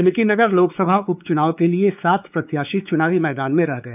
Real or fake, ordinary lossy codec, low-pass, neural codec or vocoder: fake; none; 3.6 kHz; codec, 16 kHz, 4 kbps, X-Codec, WavLM features, trained on Multilingual LibriSpeech